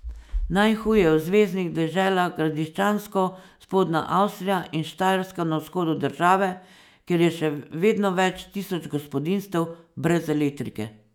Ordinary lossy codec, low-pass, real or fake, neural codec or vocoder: none; 19.8 kHz; fake; autoencoder, 48 kHz, 128 numbers a frame, DAC-VAE, trained on Japanese speech